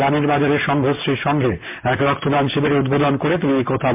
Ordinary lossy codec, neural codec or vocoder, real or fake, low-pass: MP3, 32 kbps; none; real; 3.6 kHz